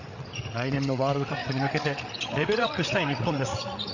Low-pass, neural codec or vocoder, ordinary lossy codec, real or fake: 7.2 kHz; codec, 16 kHz, 8 kbps, FreqCodec, larger model; none; fake